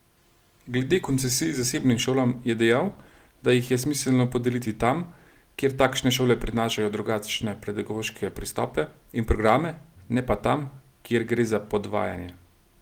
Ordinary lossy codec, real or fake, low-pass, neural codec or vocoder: Opus, 24 kbps; real; 19.8 kHz; none